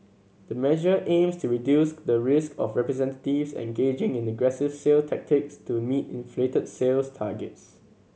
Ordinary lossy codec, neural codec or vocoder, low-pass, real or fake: none; none; none; real